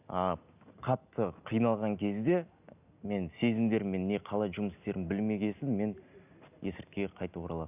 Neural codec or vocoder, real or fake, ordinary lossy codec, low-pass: none; real; none; 3.6 kHz